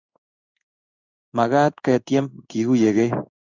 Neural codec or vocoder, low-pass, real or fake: codec, 16 kHz in and 24 kHz out, 1 kbps, XY-Tokenizer; 7.2 kHz; fake